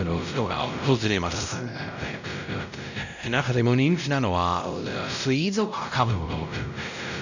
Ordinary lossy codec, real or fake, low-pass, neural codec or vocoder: none; fake; 7.2 kHz; codec, 16 kHz, 0.5 kbps, X-Codec, WavLM features, trained on Multilingual LibriSpeech